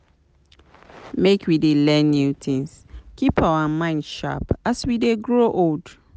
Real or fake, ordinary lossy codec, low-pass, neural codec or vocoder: real; none; none; none